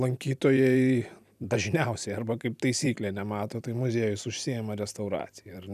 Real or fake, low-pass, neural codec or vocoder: fake; 14.4 kHz; vocoder, 44.1 kHz, 128 mel bands every 256 samples, BigVGAN v2